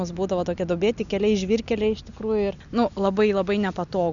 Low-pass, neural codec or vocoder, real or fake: 7.2 kHz; none; real